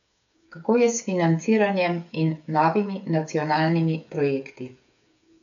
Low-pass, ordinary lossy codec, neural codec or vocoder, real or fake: 7.2 kHz; none; codec, 16 kHz, 8 kbps, FreqCodec, smaller model; fake